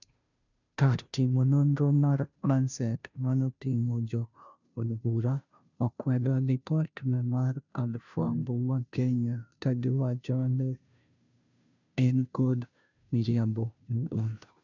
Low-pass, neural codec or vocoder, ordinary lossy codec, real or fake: 7.2 kHz; codec, 16 kHz, 0.5 kbps, FunCodec, trained on Chinese and English, 25 frames a second; none; fake